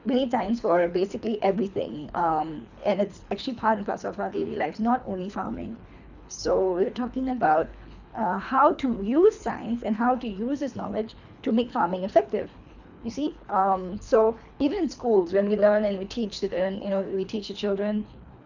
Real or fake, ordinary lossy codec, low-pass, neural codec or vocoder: fake; none; 7.2 kHz; codec, 24 kHz, 3 kbps, HILCodec